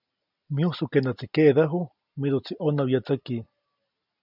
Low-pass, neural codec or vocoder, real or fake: 5.4 kHz; none; real